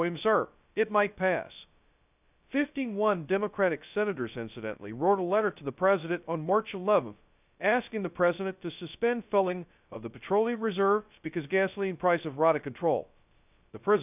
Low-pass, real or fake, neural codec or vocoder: 3.6 kHz; fake; codec, 16 kHz, 0.2 kbps, FocalCodec